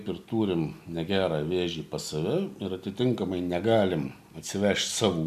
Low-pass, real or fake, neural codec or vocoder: 14.4 kHz; real; none